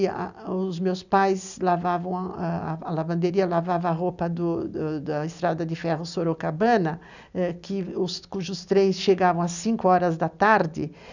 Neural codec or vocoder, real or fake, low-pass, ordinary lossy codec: none; real; 7.2 kHz; none